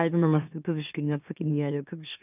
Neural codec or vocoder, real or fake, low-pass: autoencoder, 44.1 kHz, a latent of 192 numbers a frame, MeloTTS; fake; 3.6 kHz